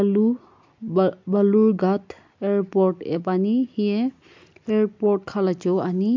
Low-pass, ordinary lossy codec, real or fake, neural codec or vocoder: 7.2 kHz; MP3, 64 kbps; real; none